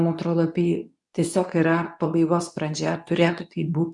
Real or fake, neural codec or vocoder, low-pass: fake; codec, 24 kHz, 0.9 kbps, WavTokenizer, medium speech release version 2; 10.8 kHz